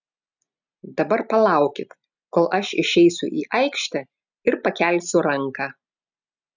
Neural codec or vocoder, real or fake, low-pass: none; real; 7.2 kHz